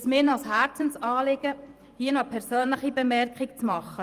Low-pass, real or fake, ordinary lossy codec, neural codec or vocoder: 14.4 kHz; fake; Opus, 32 kbps; vocoder, 44.1 kHz, 128 mel bands every 512 samples, BigVGAN v2